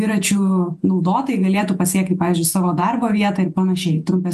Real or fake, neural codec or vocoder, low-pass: fake; vocoder, 48 kHz, 128 mel bands, Vocos; 14.4 kHz